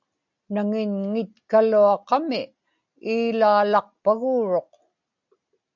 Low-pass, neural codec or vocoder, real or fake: 7.2 kHz; none; real